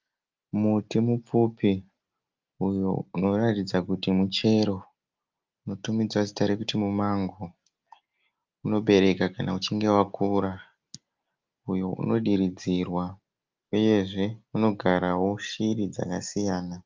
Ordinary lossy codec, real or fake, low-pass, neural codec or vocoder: Opus, 24 kbps; real; 7.2 kHz; none